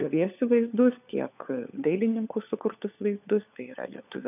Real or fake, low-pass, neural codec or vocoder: fake; 3.6 kHz; codec, 16 kHz, 4 kbps, FunCodec, trained on LibriTTS, 50 frames a second